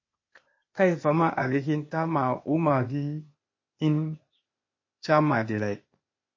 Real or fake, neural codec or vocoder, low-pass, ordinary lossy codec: fake; codec, 16 kHz, 0.8 kbps, ZipCodec; 7.2 kHz; MP3, 32 kbps